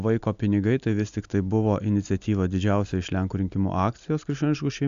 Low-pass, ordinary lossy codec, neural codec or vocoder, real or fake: 7.2 kHz; AAC, 96 kbps; none; real